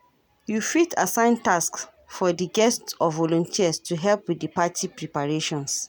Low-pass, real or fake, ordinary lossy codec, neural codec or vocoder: none; real; none; none